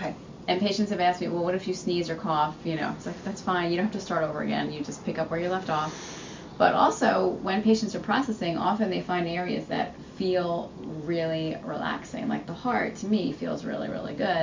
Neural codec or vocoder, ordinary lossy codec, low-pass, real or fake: none; MP3, 64 kbps; 7.2 kHz; real